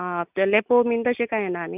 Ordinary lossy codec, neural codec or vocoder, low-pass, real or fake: none; none; 3.6 kHz; real